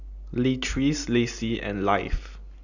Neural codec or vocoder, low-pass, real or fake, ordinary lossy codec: none; 7.2 kHz; real; none